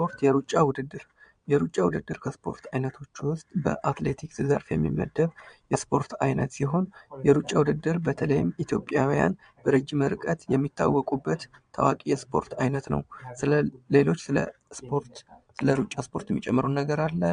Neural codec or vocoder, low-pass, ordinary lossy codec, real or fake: vocoder, 22.05 kHz, 80 mel bands, Vocos; 9.9 kHz; MP3, 64 kbps; fake